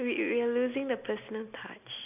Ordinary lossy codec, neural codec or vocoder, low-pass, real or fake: none; none; 3.6 kHz; real